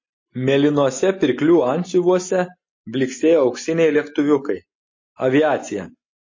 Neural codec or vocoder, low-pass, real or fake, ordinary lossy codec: none; 7.2 kHz; real; MP3, 32 kbps